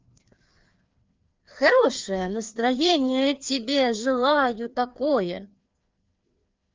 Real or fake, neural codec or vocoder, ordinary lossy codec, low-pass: fake; codec, 16 kHz, 2 kbps, FreqCodec, larger model; Opus, 32 kbps; 7.2 kHz